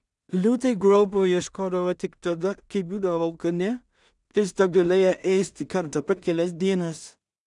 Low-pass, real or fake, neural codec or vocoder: 10.8 kHz; fake; codec, 16 kHz in and 24 kHz out, 0.4 kbps, LongCat-Audio-Codec, two codebook decoder